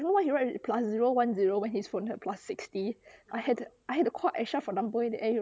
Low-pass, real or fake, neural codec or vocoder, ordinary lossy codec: none; real; none; none